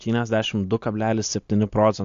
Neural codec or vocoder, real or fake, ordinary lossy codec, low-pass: none; real; AAC, 64 kbps; 7.2 kHz